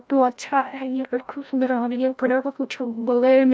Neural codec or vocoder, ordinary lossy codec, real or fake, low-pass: codec, 16 kHz, 0.5 kbps, FreqCodec, larger model; none; fake; none